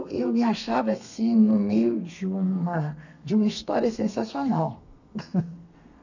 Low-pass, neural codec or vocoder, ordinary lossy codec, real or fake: 7.2 kHz; codec, 32 kHz, 1.9 kbps, SNAC; none; fake